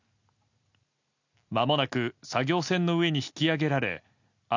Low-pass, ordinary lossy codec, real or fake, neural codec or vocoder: 7.2 kHz; none; real; none